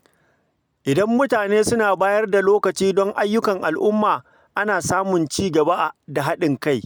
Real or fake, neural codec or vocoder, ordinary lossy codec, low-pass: real; none; none; none